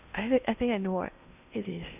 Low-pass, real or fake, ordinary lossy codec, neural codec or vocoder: 3.6 kHz; fake; none; codec, 16 kHz in and 24 kHz out, 0.8 kbps, FocalCodec, streaming, 65536 codes